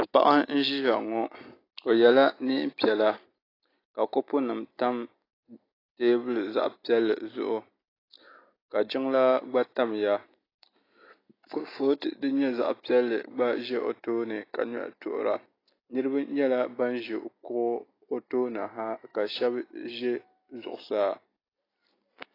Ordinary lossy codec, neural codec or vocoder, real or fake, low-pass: AAC, 24 kbps; none; real; 5.4 kHz